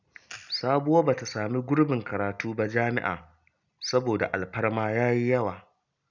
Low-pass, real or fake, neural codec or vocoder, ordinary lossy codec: 7.2 kHz; real; none; none